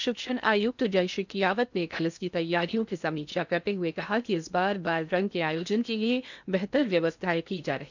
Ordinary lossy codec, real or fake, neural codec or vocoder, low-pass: none; fake; codec, 16 kHz in and 24 kHz out, 0.6 kbps, FocalCodec, streaming, 2048 codes; 7.2 kHz